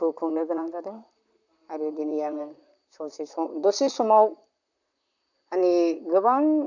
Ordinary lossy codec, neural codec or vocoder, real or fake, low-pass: none; vocoder, 44.1 kHz, 128 mel bands, Pupu-Vocoder; fake; 7.2 kHz